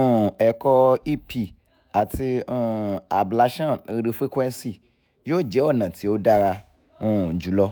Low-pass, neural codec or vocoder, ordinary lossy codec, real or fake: none; autoencoder, 48 kHz, 128 numbers a frame, DAC-VAE, trained on Japanese speech; none; fake